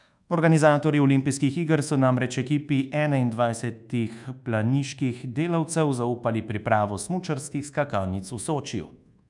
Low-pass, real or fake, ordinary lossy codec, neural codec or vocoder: 10.8 kHz; fake; none; codec, 24 kHz, 1.2 kbps, DualCodec